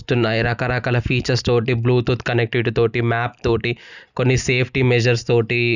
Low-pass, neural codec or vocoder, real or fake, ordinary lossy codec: 7.2 kHz; none; real; none